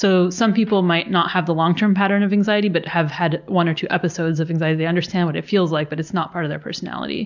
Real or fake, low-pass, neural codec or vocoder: real; 7.2 kHz; none